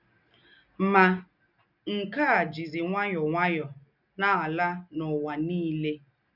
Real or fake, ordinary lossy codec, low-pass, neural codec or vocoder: real; none; 5.4 kHz; none